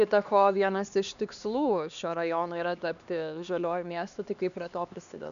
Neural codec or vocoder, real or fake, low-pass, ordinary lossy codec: codec, 16 kHz, 2 kbps, X-Codec, HuBERT features, trained on LibriSpeech; fake; 7.2 kHz; MP3, 96 kbps